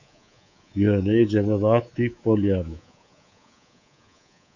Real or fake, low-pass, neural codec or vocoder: fake; 7.2 kHz; codec, 24 kHz, 3.1 kbps, DualCodec